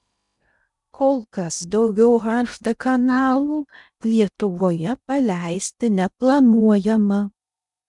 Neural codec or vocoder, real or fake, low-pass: codec, 16 kHz in and 24 kHz out, 0.6 kbps, FocalCodec, streaming, 2048 codes; fake; 10.8 kHz